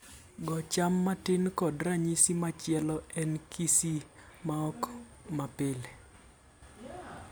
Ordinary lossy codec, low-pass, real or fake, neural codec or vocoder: none; none; real; none